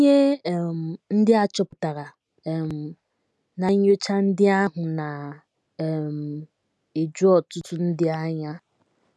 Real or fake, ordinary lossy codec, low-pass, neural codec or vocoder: real; none; none; none